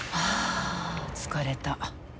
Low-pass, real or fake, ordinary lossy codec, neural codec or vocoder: none; real; none; none